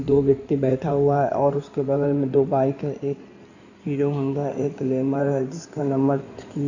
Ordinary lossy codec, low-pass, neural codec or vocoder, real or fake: none; 7.2 kHz; codec, 16 kHz in and 24 kHz out, 2.2 kbps, FireRedTTS-2 codec; fake